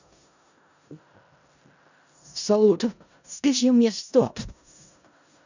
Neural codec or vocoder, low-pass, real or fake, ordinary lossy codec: codec, 16 kHz in and 24 kHz out, 0.4 kbps, LongCat-Audio-Codec, four codebook decoder; 7.2 kHz; fake; none